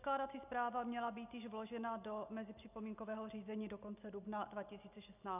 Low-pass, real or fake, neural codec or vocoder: 3.6 kHz; real; none